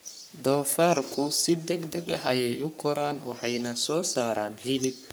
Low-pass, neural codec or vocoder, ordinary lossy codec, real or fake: none; codec, 44.1 kHz, 3.4 kbps, Pupu-Codec; none; fake